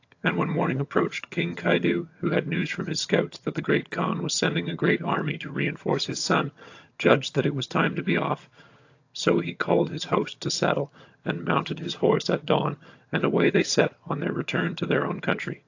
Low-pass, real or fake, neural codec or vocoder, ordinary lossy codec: 7.2 kHz; fake; vocoder, 22.05 kHz, 80 mel bands, HiFi-GAN; AAC, 48 kbps